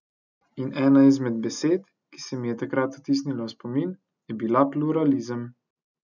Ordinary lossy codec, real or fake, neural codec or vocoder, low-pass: none; real; none; 7.2 kHz